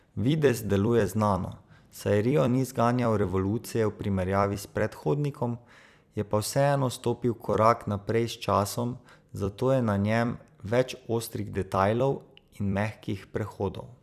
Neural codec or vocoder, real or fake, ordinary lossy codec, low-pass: vocoder, 44.1 kHz, 128 mel bands every 256 samples, BigVGAN v2; fake; none; 14.4 kHz